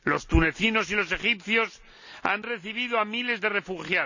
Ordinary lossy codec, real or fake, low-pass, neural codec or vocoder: none; real; 7.2 kHz; none